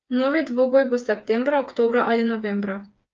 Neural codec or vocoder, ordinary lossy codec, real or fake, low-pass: codec, 16 kHz, 4 kbps, FreqCodec, smaller model; Opus, 24 kbps; fake; 7.2 kHz